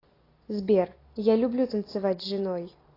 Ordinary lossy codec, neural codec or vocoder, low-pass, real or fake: AAC, 24 kbps; none; 5.4 kHz; real